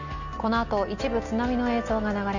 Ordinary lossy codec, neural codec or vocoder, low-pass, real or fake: none; none; 7.2 kHz; real